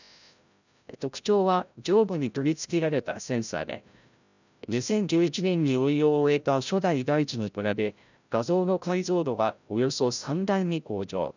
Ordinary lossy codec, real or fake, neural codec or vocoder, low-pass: none; fake; codec, 16 kHz, 0.5 kbps, FreqCodec, larger model; 7.2 kHz